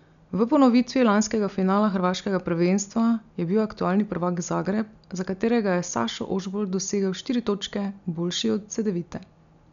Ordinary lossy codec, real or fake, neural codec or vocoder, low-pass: none; real; none; 7.2 kHz